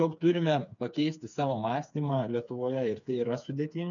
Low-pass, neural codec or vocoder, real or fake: 7.2 kHz; codec, 16 kHz, 4 kbps, FreqCodec, smaller model; fake